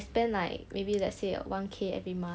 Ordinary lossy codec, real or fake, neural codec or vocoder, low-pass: none; real; none; none